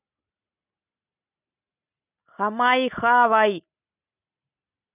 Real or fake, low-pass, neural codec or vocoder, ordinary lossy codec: real; 3.6 kHz; none; none